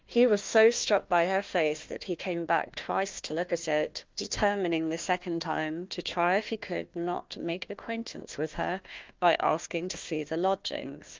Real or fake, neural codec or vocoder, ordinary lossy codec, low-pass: fake; codec, 16 kHz, 1 kbps, FunCodec, trained on Chinese and English, 50 frames a second; Opus, 24 kbps; 7.2 kHz